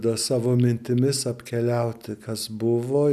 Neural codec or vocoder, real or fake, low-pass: none; real; 14.4 kHz